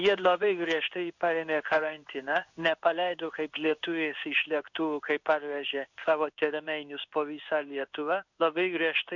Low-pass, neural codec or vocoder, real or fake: 7.2 kHz; codec, 16 kHz in and 24 kHz out, 1 kbps, XY-Tokenizer; fake